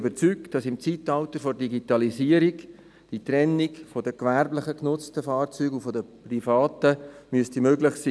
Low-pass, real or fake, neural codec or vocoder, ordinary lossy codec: none; real; none; none